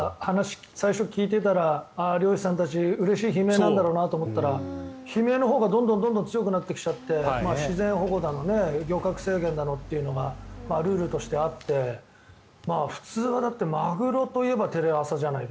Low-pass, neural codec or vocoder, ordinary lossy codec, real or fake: none; none; none; real